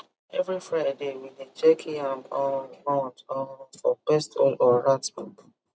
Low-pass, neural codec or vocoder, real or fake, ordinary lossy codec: none; none; real; none